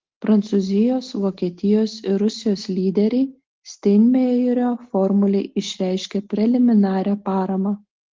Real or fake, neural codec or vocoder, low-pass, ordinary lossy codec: real; none; 7.2 kHz; Opus, 16 kbps